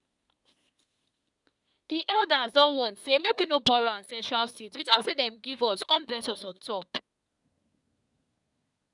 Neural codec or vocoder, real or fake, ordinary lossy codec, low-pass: codec, 24 kHz, 1 kbps, SNAC; fake; none; 10.8 kHz